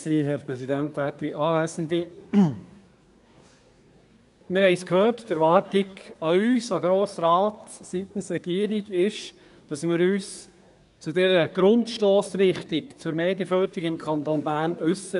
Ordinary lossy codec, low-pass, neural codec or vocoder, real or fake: none; 10.8 kHz; codec, 24 kHz, 1 kbps, SNAC; fake